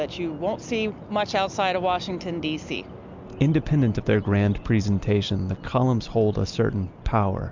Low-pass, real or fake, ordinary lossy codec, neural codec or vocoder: 7.2 kHz; real; MP3, 64 kbps; none